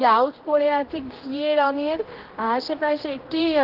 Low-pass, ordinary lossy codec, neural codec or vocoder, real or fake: 5.4 kHz; Opus, 16 kbps; codec, 16 kHz, 1 kbps, X-Codec, HuBERT features, trained on general audio; fake